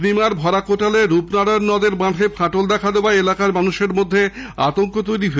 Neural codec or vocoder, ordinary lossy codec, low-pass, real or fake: none; none; none; real